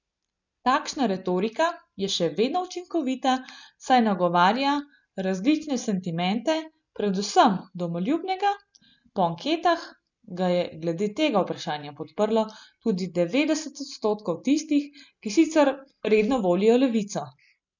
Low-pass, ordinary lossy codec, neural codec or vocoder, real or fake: 7.2 kHz; none; none; real